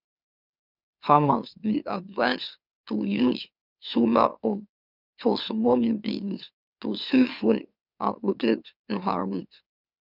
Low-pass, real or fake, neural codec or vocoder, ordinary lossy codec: 5.4 kHz; fake; autoencoder, 44.1 kHz, a latent of 192 numbers a frame, MeloTTS; none